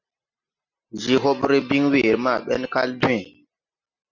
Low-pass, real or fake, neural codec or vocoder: 7.2 kHz; real; none